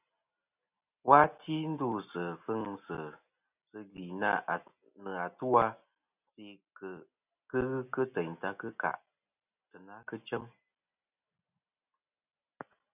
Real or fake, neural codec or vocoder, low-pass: real; none; 3.6 kHz